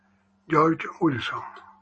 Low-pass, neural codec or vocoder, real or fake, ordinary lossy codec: 9.9 kHz; none; real; MP3, 32 kbps